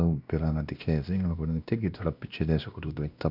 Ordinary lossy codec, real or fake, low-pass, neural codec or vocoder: none; fake; 5.4 kHz; codec, 16 kHz, 1 kbps, X-Codec, WavLM features, trained on Multilingual LibriSpeech